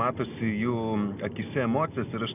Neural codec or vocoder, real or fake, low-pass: none; real; 3.6 kHz